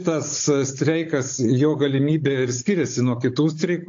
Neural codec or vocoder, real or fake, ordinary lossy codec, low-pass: codec, 16 kHz, 16 kbps, FunCodec, trained on Chinese and English, 50 frames a second; fake; AAC, 48 kbps; 7.2 kHz